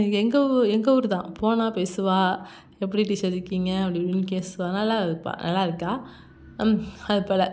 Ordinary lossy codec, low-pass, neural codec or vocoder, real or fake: none; none; none; real